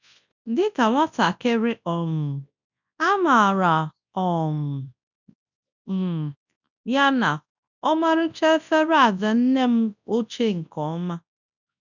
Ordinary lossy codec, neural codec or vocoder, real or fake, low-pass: none; codec, 24 kHz, 0.9 kbps, WavTokenizer, large speech release; fake; 7.2 kHz